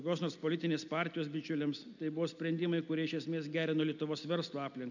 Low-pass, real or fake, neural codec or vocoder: 7.2 kHz; real; none